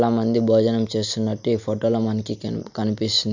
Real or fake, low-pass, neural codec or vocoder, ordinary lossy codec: real; 7.2 kHz; none; none